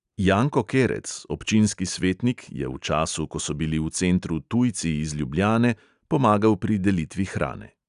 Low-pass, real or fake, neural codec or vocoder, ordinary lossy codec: 10.8 kHz; real; none; none